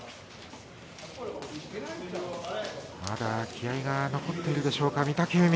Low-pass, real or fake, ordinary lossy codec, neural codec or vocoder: none; real; none; none